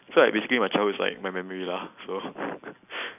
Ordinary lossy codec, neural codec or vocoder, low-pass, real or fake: none; none; 3.6 kHz; real